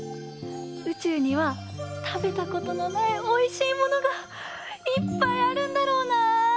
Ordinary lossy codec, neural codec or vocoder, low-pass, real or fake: none; none; none; real